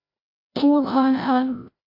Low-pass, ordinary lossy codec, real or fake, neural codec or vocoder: 5.4 kHz; AAC, 32 kbps; fake; codec, 16 kHz, 0.5 kbps, FreqCodec, larger model